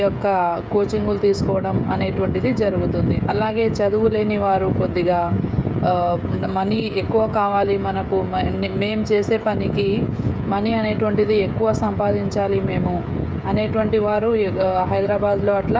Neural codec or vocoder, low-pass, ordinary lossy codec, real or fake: codec, 16 kHz, 16 kbps, FreqCodec, smaller model; none; none; fake